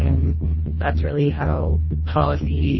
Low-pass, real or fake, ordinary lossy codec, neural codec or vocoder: 7.2 kHz; fake; MP3, 24 kbps; codec, 24 kHz, 1.5 kbps, HILCodec